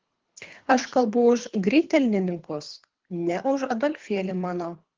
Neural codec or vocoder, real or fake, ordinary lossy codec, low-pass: codec, 24 kHz, 3 kbps, HILCodec; fake; Opus, 16 kbps; 7.2 kHz